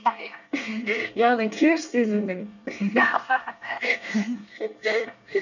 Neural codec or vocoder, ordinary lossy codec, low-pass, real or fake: codec, 24 kHz, 1 kbps, SNAC; none; 7.2 kHz; fake